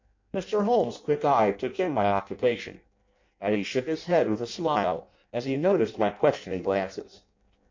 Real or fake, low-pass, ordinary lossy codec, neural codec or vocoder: fake; 7.2 kHz; MP3, 64 kbps; codec, 16 kHz in and 24 kHz out, 0.6 kbps, FireRedTTS-2 codec